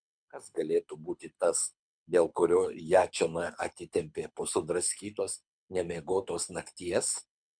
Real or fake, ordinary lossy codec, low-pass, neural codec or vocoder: fake; MP3, 96 kbps; 9.9 kHz; codec, 24 kHz, 6 kbps, HILCodec